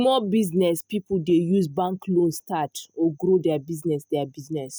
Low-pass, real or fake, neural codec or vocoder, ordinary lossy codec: none; real; none; none